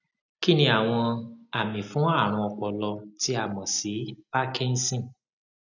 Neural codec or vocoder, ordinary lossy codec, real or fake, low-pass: none; none; real; 7.2 kHz